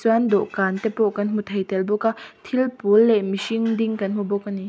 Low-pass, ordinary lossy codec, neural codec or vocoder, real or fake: none; none; none; real